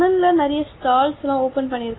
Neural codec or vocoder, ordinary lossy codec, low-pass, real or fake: none; AAC, 16 kbps; 7.2 kHz; real